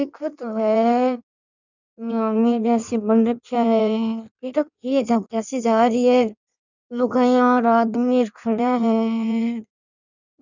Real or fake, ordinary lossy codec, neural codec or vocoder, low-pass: fake; none; codec, 16 kHz in and 24 kHz out, 1.1 kbps, FireRedTTS-2 codec; 7.2 kHz